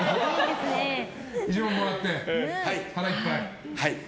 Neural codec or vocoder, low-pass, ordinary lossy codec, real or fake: none; none; none; real